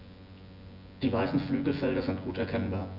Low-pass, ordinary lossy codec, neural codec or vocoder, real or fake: 5.4 kHz; none; vocoder, 24 kHz, 100 mel bands, Vocos; fake